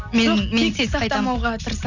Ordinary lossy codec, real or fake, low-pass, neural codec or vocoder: none; real; 7.2 kHz; none